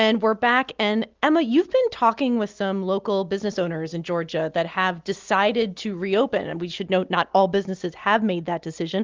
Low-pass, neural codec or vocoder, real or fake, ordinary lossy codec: 7.2 kHz; none; real; Opus, 24 kbps